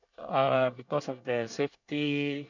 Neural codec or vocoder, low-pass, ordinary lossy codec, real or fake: codec, 24 kHz, 1 kbps, SNAC; 7.2 kHz; none; fake